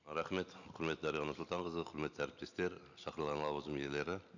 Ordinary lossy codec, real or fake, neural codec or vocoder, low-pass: none; real; none; 7.2 kHz